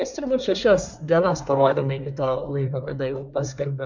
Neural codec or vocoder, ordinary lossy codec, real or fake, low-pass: codec, 24 kHz, 1 kbps, SNAC; MP3, 64 kbps; fake; 7.2 kHz